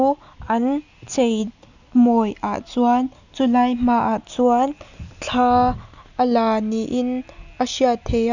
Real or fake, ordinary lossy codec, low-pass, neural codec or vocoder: real; none; 7.2 kHz; none